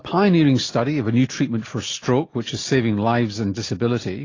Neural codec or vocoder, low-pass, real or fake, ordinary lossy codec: none; 7.2 kHz; real; AAC, 32 kbps